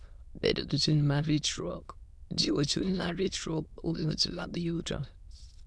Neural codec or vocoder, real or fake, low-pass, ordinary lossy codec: autoencoder, 22.05 kHz, a latent of 192 numbers a frame, VITS, trained on many speakers; fake; none; none